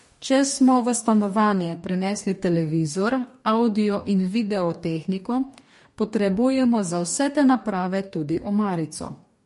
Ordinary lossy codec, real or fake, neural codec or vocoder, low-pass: MP3, 48 kbps; fake; codec, 44.1 kHz, 2.6 kbps, DAC; 14.4 kHz